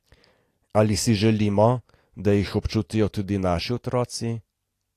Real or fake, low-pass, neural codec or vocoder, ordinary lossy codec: real; 14.4 kHz; none; AAC, 48 kbps